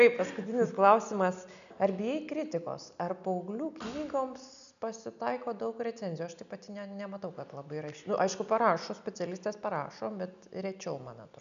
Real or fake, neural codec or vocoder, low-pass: real; none; 7.2 kHz